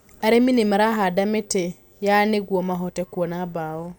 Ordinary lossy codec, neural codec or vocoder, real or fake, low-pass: none; none; real; none